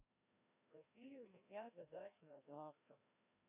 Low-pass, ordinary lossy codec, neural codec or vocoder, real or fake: 3.6 kHz; none; codec, 16 kHz, 1 kbps, FreqCodec, larger model; fake